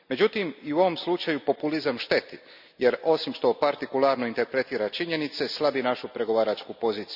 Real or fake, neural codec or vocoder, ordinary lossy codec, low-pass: real; none; none; 5.4 kHz